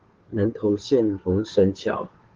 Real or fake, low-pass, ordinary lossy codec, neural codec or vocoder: fake; 7.2 kHz; Opus, 16 kbps; codec, 16 kHz, 2 kbps, FunCodec, trained on Chinese and English, 25 frames a second